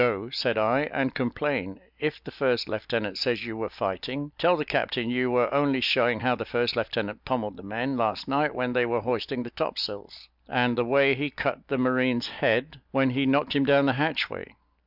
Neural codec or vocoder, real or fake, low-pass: none; real; 5.4 kHz